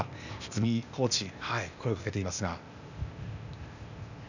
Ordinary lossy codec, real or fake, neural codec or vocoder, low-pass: none; fake; codec, 16 kHz, 0.8 kbps, ZipCodec; 7.2 kHz